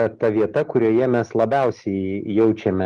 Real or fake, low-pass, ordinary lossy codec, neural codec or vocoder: real; 10.8 kHz; Opus, 24 kbps; none